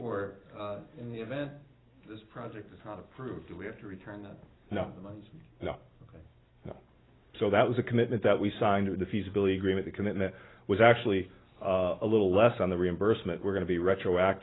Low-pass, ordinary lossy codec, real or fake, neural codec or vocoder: 7.2 kHz; AAC, 16 kbps; real; none